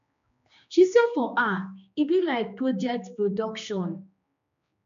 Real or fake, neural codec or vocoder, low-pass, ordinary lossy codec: fake; codec, 16 kHz, 2 kbps, X-Codec, HuBERT features, trained on general audio; 7.2 kHz; AAC, 96 kbps